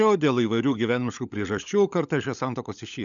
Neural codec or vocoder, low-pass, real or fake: codec, 16 kHz, 16 kbps, FunCodec, trained on LibriTTS, 50 frames a second; 7.2 kHz; fake